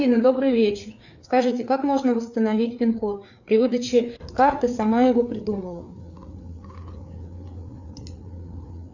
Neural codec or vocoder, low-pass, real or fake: codec, 16 kHz, 4 kbps, FreqCodec, larger model; 7.2 kHz; fake